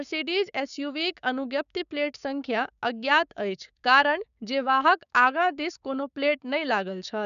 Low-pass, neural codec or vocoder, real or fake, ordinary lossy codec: 7.2 kHz; codec, 16 kHz, 6 kbps, DAC; fake; none